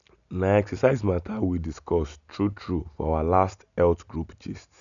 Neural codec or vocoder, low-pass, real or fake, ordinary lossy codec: none; 7.2 kHz; real; none